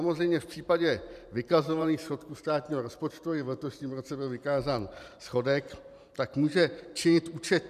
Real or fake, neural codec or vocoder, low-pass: fake; vocoder, 44.1 kHz, 128 mel bands every 512 samples, BigVGAN v2; 14.4 kHz